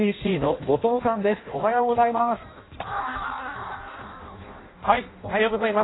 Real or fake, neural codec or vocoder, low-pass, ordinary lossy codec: fake; codec, 16 kHz in and 24 kHz out, 0.6 kbps, FireRedTTS-2 codec; 7.2 kHz; AAC, 16 kbps